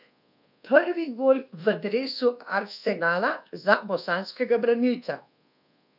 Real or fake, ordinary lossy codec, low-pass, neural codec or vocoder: fake; none; 5.4 kHz; codec, 24 kHz, 1.2 kbps, DualCodec